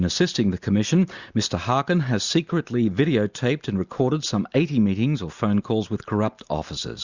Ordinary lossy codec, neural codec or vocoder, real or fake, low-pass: Opus, 64 kbps; none; real; 7.2 kHz